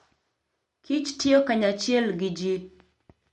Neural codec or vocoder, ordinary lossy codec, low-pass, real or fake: none; MP3, 48 kbps; 14.4 kHz; real